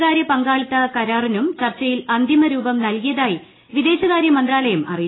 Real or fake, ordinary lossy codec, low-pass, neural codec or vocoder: real; AAC, 16 kbps; 7.2 kHz; none